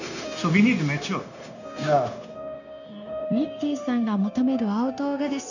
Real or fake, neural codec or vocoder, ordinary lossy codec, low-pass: fake; codec, 16 kHz, 0.9 kbps, LongCat-Audio-Codec; none; 7.2 kHz